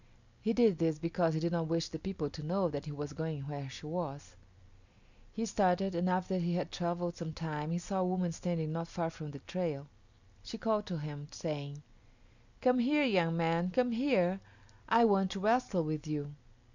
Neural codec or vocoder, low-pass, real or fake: none; 7.2 kHz; real